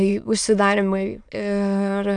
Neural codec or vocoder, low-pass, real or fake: autoencoder, 22.05 kHz, a latent of 192 numbers a frame, VITS, trained on many speakers; 9.9 kHz; fake